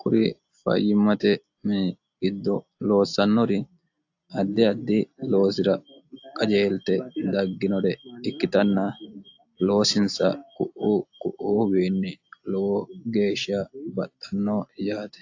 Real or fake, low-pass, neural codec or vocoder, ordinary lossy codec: real; 7.2 kHz; none; AAC, 48 kbps